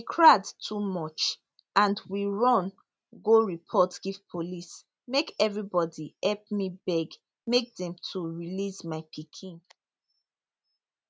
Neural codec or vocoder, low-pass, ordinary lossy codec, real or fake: none; none; none; real